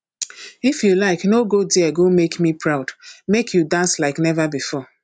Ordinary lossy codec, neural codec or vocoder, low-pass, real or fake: none; none; 9.9 kHz; real